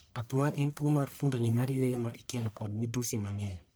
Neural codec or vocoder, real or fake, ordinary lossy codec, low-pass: codec, 44.1 kHz, 1.7 kbps, Pupu-Codec; fake; none; none